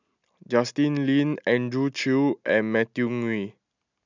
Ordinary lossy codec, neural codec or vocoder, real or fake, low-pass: none; none; real; 7.2 kHz